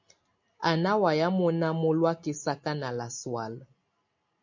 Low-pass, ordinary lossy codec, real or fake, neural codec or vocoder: 7.2 kHz; MP3, 48 kbps; real; none